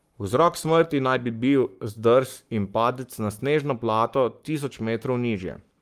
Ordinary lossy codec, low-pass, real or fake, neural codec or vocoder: Opus, 32 kbps; 14.4 kHz; fake; codec, 44.1 kHz, 7.8 kbps, Pupu-Codec